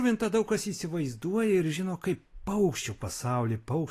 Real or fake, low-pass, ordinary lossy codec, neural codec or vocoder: real; 14.4 kHz; AAC, 48 kbps; none